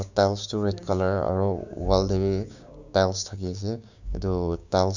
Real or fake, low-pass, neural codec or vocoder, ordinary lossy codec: fake; 7.2 kHz; codec, 16 kHz, 6 kbps, DAC; none